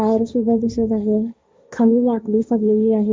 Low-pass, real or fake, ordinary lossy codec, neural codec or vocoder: none; fake; none; codec, 16 kHz, 1.1 kbps, Voila-Tokenizer